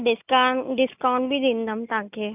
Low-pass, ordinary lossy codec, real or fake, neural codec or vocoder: 3.6 kHz; none; real; none